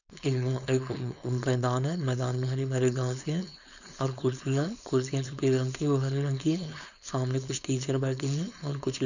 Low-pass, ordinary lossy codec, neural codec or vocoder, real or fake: 7.2 kHz; none; codec, 16 kHz, 4.8 kbps, FACodec; fake